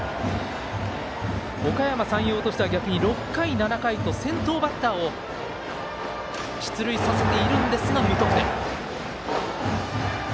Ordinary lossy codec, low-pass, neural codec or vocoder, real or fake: none; none; none; real